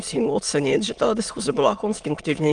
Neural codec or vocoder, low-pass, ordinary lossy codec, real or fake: autoencoder, 22.05 kHz, a latent of 192 numbers a frame, VITS, trained on many speakers; 9.9 kHz; Opus, 32 kbps; fake